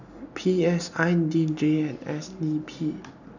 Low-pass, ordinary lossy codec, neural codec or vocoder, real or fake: 7.2 kHz; none; none; real